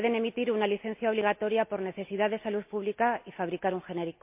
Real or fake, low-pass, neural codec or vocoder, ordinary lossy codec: real; 3.6 kHz; none; MP3, 32 kbps